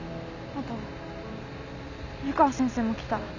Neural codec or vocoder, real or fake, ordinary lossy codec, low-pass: none; real; none; 7.2 kHz